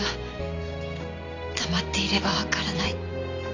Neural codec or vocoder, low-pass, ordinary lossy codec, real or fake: none; 7.2 kHz; AAC, 48 kbps; real